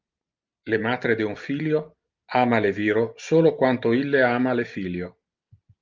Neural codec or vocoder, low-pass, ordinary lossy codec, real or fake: none; 7.2 kHz; Opus, 32 kbps; real